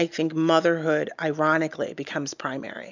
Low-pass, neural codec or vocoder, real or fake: 7.2 kHz; none; real